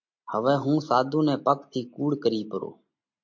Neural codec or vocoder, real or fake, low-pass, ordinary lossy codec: none; real; 7.2 kHz; MP3, 48 kbps